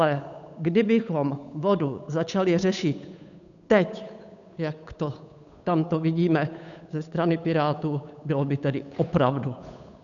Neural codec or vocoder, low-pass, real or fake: codec, 16 kHz, 8 kbps, FunCodec, trained on Chinese and English, 25 frames a second; 7.2 kHz; fake